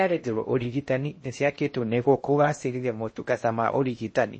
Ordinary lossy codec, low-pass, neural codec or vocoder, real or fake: MP3, 32 kbps; 9.9 kHz; codec, 16 kHz in and 24 kHz out, 0.6 kbps, FocalCodec, streaming, 2048 codes; fake